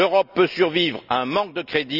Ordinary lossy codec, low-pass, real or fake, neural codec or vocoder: none; 5.4 kHz; real; none